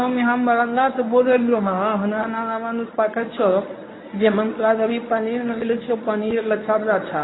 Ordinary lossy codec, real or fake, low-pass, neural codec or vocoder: AAC, 16 kbps; fake; 7.2 kHz; codec, 24 kHz, 0.9 kbps, WavTokenizer, medium speech release version 1